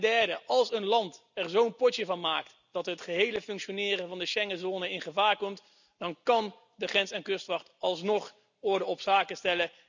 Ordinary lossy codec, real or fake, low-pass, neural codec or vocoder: none; real; 7.2 kHz; none